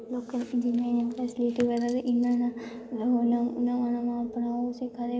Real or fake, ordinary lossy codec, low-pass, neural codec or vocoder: real; none; none; none